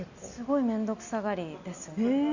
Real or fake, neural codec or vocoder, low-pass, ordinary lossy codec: real; none; 7.2 kHz; none